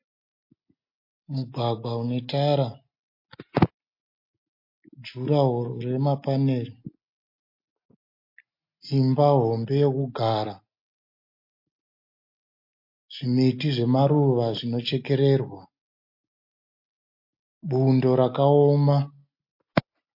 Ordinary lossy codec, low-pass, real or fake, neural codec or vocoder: MP3, 32 kbps; 5.4 kHz; real; none